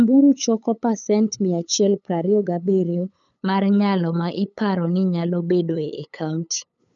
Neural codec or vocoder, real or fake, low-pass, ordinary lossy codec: codec, 16 kHz, 8 kbps, FunCodec, trained on LibriTTS, 25 frames a second; fake; 7.2 kHz; none